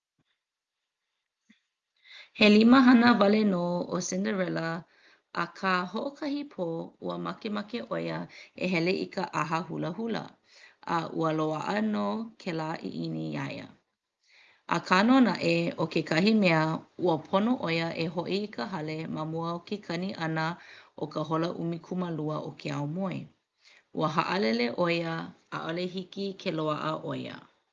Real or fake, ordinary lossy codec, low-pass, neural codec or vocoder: real; Opus, 32 kbps; 7.2 kHz; none